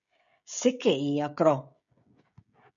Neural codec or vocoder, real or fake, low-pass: codec, 16 kHz, 16 kbps, FreqCodec, smaller model; fake; 7.2 kHz